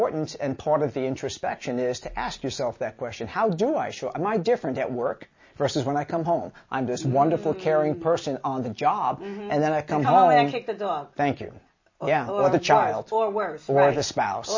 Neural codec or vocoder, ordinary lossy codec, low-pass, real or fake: none; MP3, 32 kbps; 7.2 kHz; real